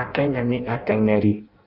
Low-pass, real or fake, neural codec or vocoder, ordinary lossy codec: 5.4 kHz; fake; codec, 16 kHz in and 24 kHz out, 0.6 kbps, FireRedTTS-2 codec; none